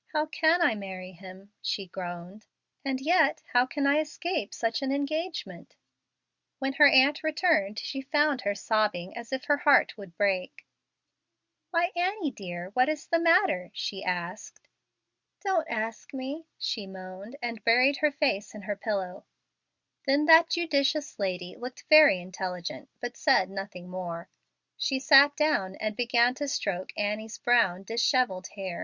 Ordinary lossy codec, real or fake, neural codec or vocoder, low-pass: Opus, 64 kbps; real; none; 7.2 kHz